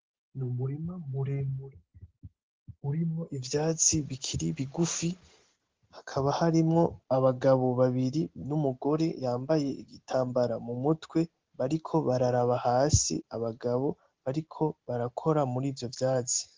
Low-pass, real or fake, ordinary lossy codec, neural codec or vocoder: 7.2 kHz; real; Opus, 16 kbps; none